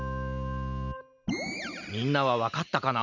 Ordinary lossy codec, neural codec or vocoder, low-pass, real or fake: none; none; 7.2 kHz; real